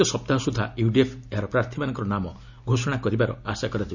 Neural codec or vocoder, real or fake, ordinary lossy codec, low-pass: none; real; none; 7.2 kHz